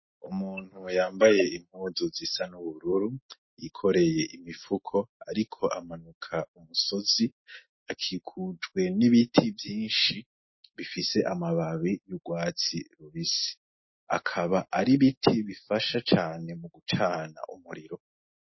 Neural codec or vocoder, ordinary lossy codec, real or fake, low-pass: none; MP3, 24 kbps; real; 7.2 kHz